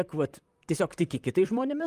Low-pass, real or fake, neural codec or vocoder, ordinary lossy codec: 14.4 kHz; fake; vocoder, 44.1 kHz, 128 mel bands, Pupu-Vocoder; Opus, 32 kbps